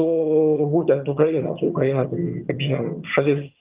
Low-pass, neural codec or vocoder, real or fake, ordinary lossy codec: 3.6 kHz; vocoder, 22.05 kHz, 80 mel bands, HiFi-GAN; fake; Opus, 24 kbps